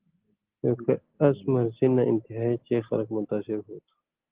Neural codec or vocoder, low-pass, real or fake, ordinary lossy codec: none; 3.6 kHz; real; Opus, 16 kbps